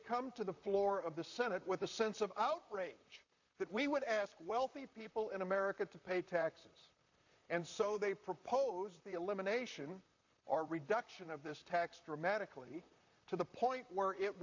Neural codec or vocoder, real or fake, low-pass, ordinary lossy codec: vocoder, 44.1 kHz, 128 mel bands, Pupu-Vocoder; fake; 7.2 kHz; AAC, 48 kbps